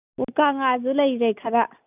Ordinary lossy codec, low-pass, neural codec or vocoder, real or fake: none; 3.6 kHz; none; real